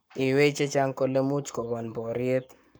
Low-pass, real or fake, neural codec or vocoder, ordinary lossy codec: none; fake; codec, 44.1 kHz, 7.8 kbps, Pupu-Codec; none